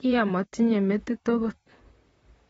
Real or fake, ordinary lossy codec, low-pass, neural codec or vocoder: fake; AAC, 24 kbps; 19.8 kHz; vocoder, 44.1 kHz, 128 mel bands, Pupu-Vocoder